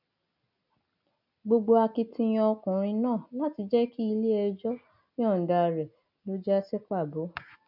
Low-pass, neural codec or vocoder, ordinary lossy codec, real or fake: 5.4 kHz; none; none; real